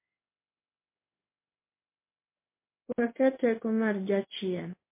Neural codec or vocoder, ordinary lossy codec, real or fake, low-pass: codec, 16 kHz in and 24 kHz out, 1 kbps, XY-Tokenizer; MP3, 24 kbps; fake; 3.6 kHz